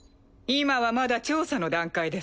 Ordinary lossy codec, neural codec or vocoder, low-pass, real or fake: none; none; none; real